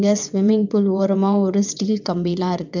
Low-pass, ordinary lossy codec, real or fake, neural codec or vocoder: 7.2 kHz; none; fake; vocoder, 22.05 kHz, 80 mel bands, WaveNeXt